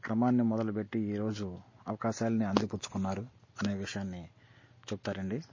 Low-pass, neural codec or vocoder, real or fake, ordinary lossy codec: 7.2 kHz; none; real; MP3, 32 kbps